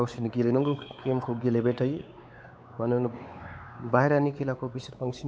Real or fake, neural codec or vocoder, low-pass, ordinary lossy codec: fake; codec, 16 kHz, 4 kbps, X-Codec, WavLM features, trained on Multilingual LibriSpeech; none; none